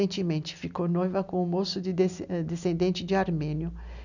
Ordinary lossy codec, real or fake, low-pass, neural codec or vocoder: none; real; 7.2 kHz; none